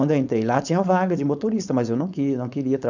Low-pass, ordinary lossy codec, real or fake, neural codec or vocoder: 7.2 kHz; none; fake; codec, 16 kHz, 4.8 kbps, FACodec